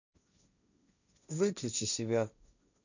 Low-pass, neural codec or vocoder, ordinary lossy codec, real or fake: 7.2 kHz; codec, 16 kHz, 1.1 kbps, Voila-Tokenizer; none; fake